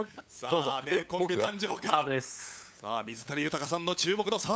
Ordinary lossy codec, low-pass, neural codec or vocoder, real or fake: none; none; codec, 16 kHz, 8 kbps, FunCodec, trained on LibriTTS, 25 frames a second; fake